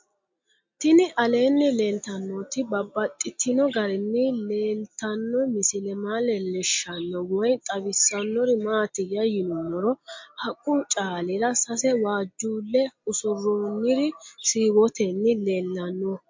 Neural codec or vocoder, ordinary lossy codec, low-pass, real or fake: none; MP3, 48 kbps; 7.2 kHz; real